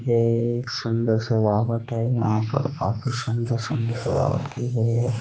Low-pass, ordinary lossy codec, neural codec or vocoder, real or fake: none; none; codec, 16 kHz, 2 kbps, X-Codec, HuBERT features, trained on general audio; fake